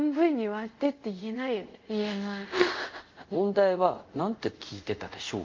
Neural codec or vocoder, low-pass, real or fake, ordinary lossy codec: codec, 24 kHz, 0.5 kbps, DualCodec; 7.2 kHz; fake; Opus, 24 kbps